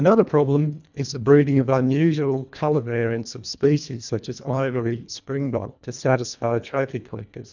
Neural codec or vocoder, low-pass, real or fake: codec, 24 kHz, 1.5 kbps, HILCodec; 7.2 kHz; fake